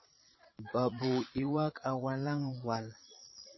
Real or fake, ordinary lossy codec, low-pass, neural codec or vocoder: fake; MP3, 24 kbps; 7.2 kHz; vocoder, 22.05 kHz, 80 mel bands, Vocos